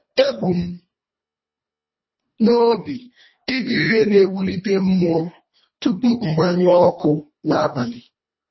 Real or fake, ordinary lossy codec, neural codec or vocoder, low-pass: fake; MP3, 24 kbps; codec, 24 kHz, 1.5 kbps, HILCodec; 7.2 kHz